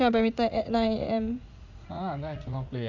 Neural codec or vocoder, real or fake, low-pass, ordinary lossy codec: vocoder, 44.1 kHz, 80 mel bands, Vocos; fake; 7.2 kHz; none